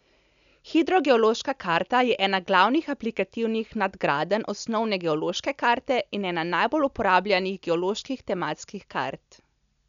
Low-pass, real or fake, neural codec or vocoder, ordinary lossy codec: 7.2 kHz; real; none; none